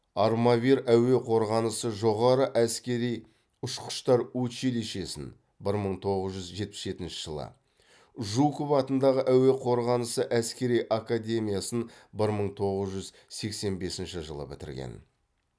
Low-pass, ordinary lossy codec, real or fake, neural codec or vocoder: none; none; real; none